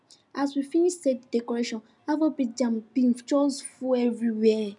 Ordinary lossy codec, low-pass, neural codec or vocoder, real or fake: none; 10.8 kHz; none; real